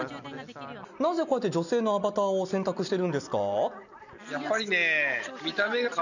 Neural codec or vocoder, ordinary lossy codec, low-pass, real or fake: none; none; 7.2 kHz; real